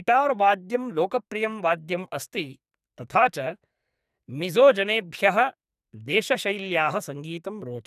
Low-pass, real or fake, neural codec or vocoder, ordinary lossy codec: 14.4 kHz; fake; codec, 44.1 kHz, 2.6 kbps, SNAC; none